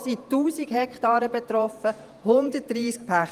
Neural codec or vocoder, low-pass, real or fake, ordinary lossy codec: vocoder, 48 kHz, 128 mel bands, Vocos; 14.4 kHz; fake; Opus, 32 kbps